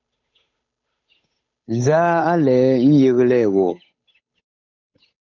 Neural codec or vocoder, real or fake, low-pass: codec, 16 kHz, 8 kbps, FunCodec, trained on Chinese and English, 25 frames a second; fake; 7.2 kHz